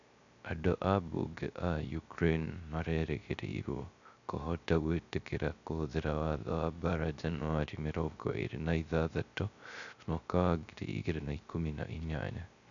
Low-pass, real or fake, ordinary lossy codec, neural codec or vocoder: 7.2 kHz; fake; none; codec, 16 kHz, 0.3 kbps, FocalCodec